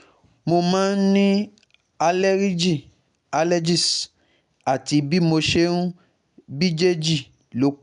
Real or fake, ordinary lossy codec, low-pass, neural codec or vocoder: real; none; 9.9 kHz; none